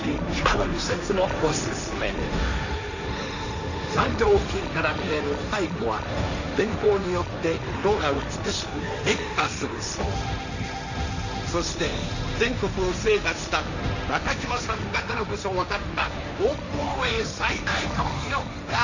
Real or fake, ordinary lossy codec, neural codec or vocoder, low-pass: fake; none; codec, 16 kHz, 1.1 kbps, Voila-Tokenizer; 7.2 kHz